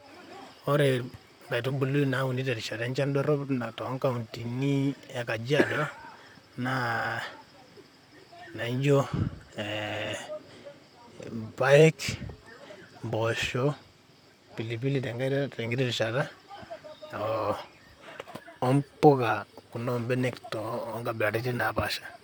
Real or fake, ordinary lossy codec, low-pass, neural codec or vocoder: fake; none; none; vocoder, 44.1 kHz, 128 mel bands, Pupu-Vocoder